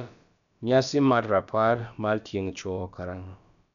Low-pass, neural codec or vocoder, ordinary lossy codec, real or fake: 7.2 kHz; codec, 16 kHz, about 1 kbps, DyCAST, with the encoder's durations; none; fake